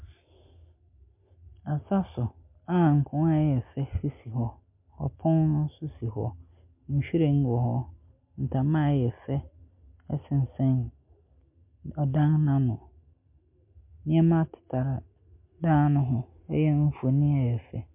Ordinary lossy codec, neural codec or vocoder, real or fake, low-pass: MP3, 32 kbps; none; real; 3.6 kHz